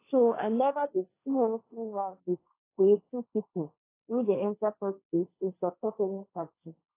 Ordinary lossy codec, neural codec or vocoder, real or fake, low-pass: AAC, 24 kbps; codec, 16 kHz, 1.1 kbps, Voila-Tokenizer; fake; 3.6 kHz